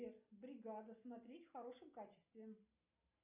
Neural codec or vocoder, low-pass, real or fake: none; 3.6 kHz; real